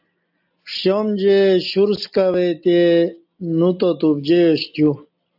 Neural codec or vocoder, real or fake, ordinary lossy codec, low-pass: none; real; AAC, 48 kbps; 5.4 kHz